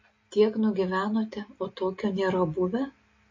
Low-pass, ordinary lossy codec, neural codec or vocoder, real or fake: 7.2 kHz; MP3, 32 kbps; none; real